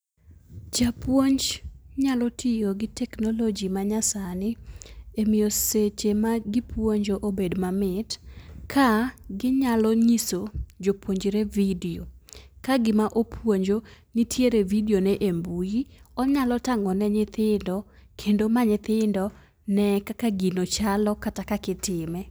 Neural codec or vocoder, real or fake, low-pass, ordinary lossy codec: none; real; none; none